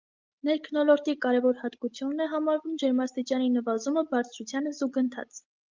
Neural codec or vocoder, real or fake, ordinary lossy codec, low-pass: none; real; Opus, 32 kbps; 7.2 kHz